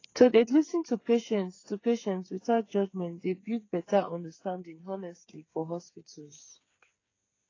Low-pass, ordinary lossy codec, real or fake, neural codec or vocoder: 7.2 kHz; AAC, 32 kbps; fake; codec, 16 kHz, 8 kbps, FreqCodec, smaller model